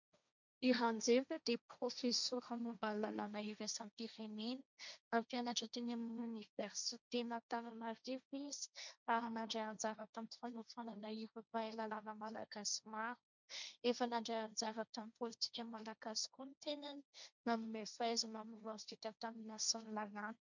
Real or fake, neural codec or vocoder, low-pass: fake; codec, 16 kHz, 1.1 kbps, Voila-Tokenizer; 7.2 kHz